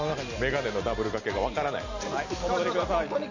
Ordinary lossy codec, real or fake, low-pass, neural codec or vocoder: none; real; 7.2 kHz; none